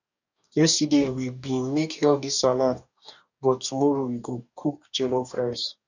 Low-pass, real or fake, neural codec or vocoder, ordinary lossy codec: 7.2 kHz; fake; codec, 44.1 kHz, 2.6 kbps, DAC; none